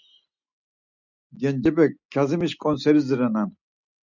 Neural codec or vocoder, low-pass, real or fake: none; 7.2 kHz; real